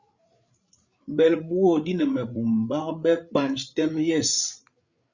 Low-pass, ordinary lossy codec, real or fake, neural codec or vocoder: 7.2 kHz; Opus, 64 kbps; fake; codec, 16 kHz, 8 kbps, FreqCodec, larger model